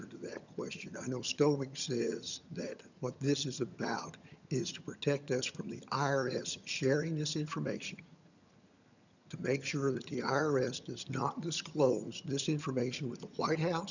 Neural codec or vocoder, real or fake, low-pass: vocoder, 22.05 kHz, 80 mel bands, HiFi-GAN; fake; 7.2 kHz